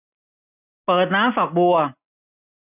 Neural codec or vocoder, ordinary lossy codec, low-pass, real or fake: none; none; 3.6 kHz; real